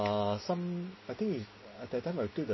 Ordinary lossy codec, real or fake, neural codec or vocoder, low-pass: MP3, 24 kbps; real; none; 7.2 kHz